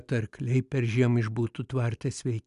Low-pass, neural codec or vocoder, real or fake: 10.8 kHz; none; real